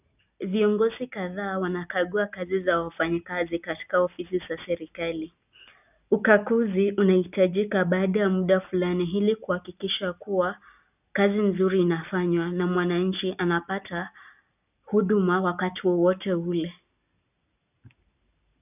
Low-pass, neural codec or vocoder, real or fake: 3.6 kHz; none; real